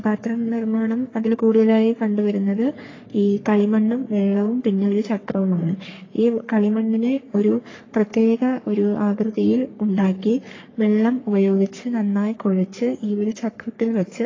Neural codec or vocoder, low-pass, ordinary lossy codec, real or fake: codec, 44.1 kHz, 3.4 kbps, Pupu-Codec; 7.2 kHz; AAC, 32 kbps; fake